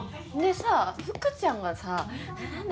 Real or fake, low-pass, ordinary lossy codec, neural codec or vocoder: real; none; none; none